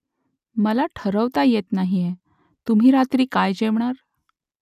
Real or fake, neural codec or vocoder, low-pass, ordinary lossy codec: real; none; 14.4 kHz; none